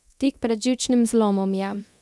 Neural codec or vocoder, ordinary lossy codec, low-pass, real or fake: codec, 24 kHz, 0.9 kbps, DualCodec; none; none; fake